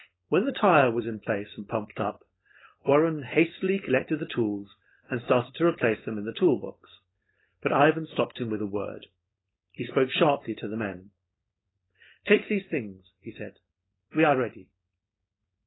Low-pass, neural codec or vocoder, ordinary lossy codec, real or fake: 7.2 kHz; codec, 16 kHz, 4.8 kbps, FACodec; AAC, 16 kbps; fake